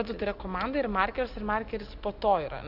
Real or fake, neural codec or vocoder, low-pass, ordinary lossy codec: real; none; 5.4 kHz; Opus, 64 kbps